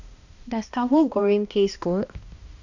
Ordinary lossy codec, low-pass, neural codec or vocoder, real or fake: none; 7.2 kHz; codec, 16 kHz, 1 kbps, X-Codec, HuBERT features, trained on balanced general audio; fake